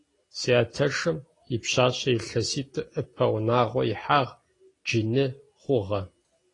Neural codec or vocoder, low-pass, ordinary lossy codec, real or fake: none; 10.8 kHz; AAC, 32 kbps; real